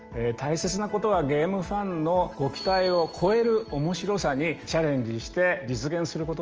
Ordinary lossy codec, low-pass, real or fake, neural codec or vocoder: Opus, 24 kbps; 7.2 kHz; real; none